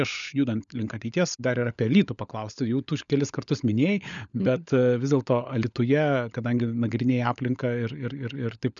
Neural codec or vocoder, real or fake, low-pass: codec, 16 kHz, 16 kbps, FunCodec, trained on LibriTTS, 50 frames a second; fake; 7.2 kHz